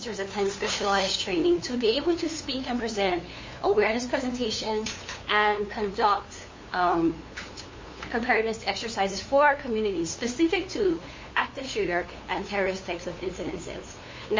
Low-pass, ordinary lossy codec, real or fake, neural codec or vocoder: 7.2 kHz; MP3, 32 kbps; fake; codec, 16 kHz, 2 kbps, FunCodec, trained on LibriTTS, 25 frames a second